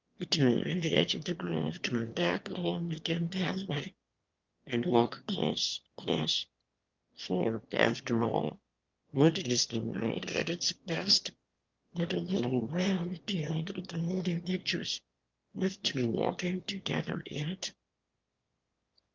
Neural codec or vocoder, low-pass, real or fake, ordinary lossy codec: autoencoder, 22.05 kHz, a latent of 192 numbers a frame, VITS, trained on one speaker; 7.2 kHz; fake; Opus, 24 kbps